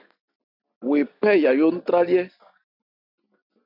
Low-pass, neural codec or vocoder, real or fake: 5.4 kHz; none; real